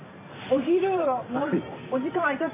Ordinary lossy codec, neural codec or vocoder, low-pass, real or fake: none; vocoder, 44.1 kHz, 128 mel bands every 512 samples, BigVGAN v2; 3.6 kHz; fake